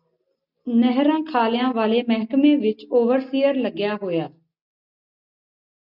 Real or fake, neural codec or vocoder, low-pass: real; none; 5.4 kHz